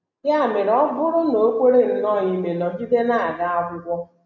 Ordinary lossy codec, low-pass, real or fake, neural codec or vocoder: none; 7.2 kHz; real; none